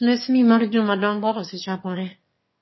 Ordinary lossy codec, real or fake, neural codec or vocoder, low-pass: MP3, 24 kbps; fake; autoencoder, 22.05 kHz, a latent of 192 numbers a frame, VITS, trained on one speaker; 7.2 kHz